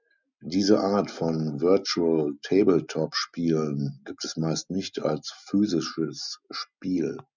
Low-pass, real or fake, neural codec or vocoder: 7.2 kHz; real; none